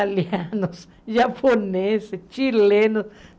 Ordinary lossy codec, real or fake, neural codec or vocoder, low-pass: none; real; none; none